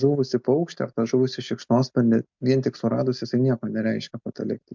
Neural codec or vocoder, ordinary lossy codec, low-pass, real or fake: none; MP3, 64 kbps; 7.2 kHz; real